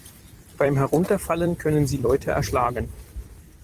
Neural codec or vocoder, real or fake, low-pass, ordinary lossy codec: vocoder, 44.1 kHz, 128 mel bands every 256 samples, BigVGAN v2; fake; 14.4 kHz; Opus, 24 kbps